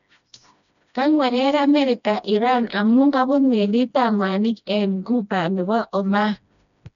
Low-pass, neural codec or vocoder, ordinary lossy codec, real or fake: 7.2 kHz; codec, 16 kHz, 1 kbps, FreqCodec, smaller model; none; fake